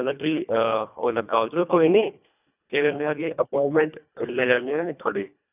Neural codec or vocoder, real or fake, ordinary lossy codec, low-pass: codec, 24 kHz, 1.5 kbps, HILCodec; fake; none; 3.6 kHz